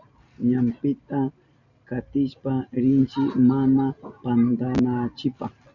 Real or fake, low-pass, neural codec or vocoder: real; 7.2 kHz; none